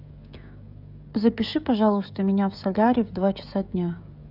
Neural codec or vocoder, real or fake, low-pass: codec, 16 kHz, 16 kbps, FreqCodec, smaller model; fake; 5.4 kHz